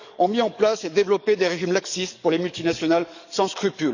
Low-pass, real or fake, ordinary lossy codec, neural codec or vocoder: 7.2 kHz; fake; none; codec, 44.1 kHz, 7.8 kbps, DAC